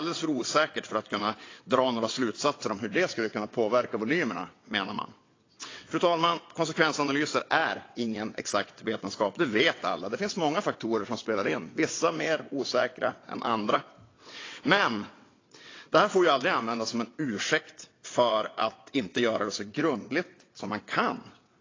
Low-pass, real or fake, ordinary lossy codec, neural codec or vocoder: 7.2 kHz; fake; AAC, 32 kbps; vocoder, 22.05 kHz, 80 mel bands, WaveNeXt